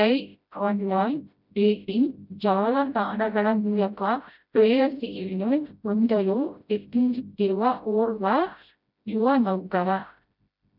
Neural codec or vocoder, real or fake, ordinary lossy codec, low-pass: codec, 16 kHz, 0.5 kbps, FreqCodec, smaller model; fake; none; 5.4 kHz